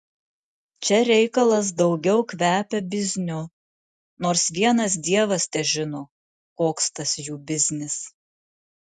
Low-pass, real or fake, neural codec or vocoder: 10.8 kHz; real; none